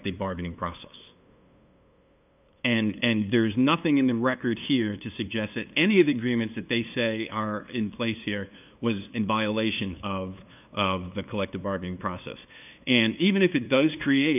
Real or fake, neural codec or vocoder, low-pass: fake; codec, 16 kHz, 2 kbps, FunCodec, trained on LibriTTS, 25 frames a second; 3.6 kHz